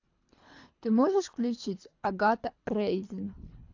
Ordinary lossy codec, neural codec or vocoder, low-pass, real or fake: AAC, 48 kbps; codec, 24 kHz, 3 kbps, HILCodec; 7.2 kHz; fake